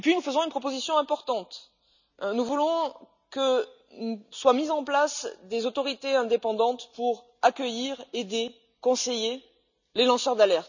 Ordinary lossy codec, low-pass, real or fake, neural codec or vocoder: none; 7.2 kHz; real; none